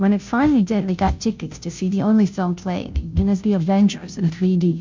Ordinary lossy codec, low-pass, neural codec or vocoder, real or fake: MP3, 64 kbps; 7.2 kHz; codec, 16 kHz, 0.5 kbps, FunCodec, trained on Chinese and English, 25 frames a second; fake